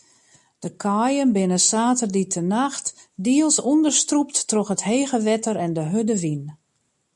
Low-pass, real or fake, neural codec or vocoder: 10.8 kHz; real; none